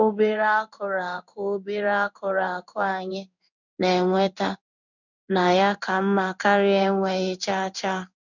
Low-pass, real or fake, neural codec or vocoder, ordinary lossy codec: 7.2 kHz; fake; codec, 16 kHz in and 24 kHz out, 1 kbps, XY-Tokenizer; none